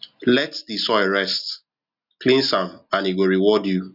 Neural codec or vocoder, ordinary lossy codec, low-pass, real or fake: none; none; 5.4 kHz; real